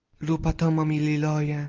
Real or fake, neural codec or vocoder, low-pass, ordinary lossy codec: real; none; 7.2 kHz; Opus, 32 kbps